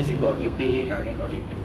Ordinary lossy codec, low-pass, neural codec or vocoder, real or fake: none; 14.4 kHz; codec, 32 kHz, 1.9 kbps, SNAC; fake